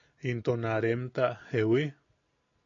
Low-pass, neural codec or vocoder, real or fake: 7.2 kHz; none; real